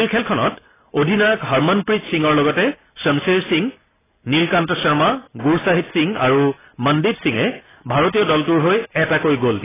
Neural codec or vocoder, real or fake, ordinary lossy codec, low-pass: none; real; AAC, 16 kbps; 3.6 kHz